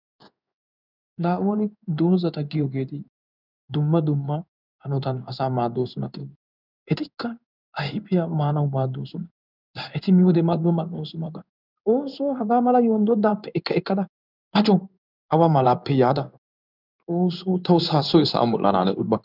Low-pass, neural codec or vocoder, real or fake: 5.4 kHz; codec, 16 kHz in and 24 kHz out, 1 kbps, XY-Tokenizer; fake